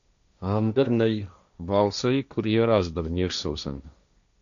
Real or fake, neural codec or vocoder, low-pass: fake; codec, 16 kHz, 1.1 kbps, Voila-Tokenizer; 7.2 kHz